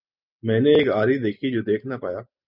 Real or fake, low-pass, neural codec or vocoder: real; 5.4 kHz; none